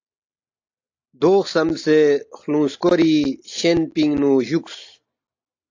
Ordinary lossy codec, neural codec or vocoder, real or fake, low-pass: AAC, 48 kbps; none; real; 7.2 kHz